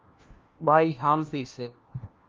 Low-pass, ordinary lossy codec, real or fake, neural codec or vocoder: 7.2 kHz; Opus, 24 kbps; fake; codec, 16 kHz, 1 kbps, FunCodec, trained on LibriTTS, 50 frames a second